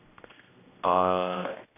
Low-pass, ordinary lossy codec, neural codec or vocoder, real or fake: 3.6 kHz; AAC, 24 kbps; codec, 16 kHz, 1 kbps, X-Codec, HuBERT features, trained on general audio; fake